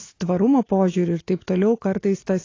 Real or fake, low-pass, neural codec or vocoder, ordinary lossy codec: real; 7.2 kHz; none; AAC, 32 kbps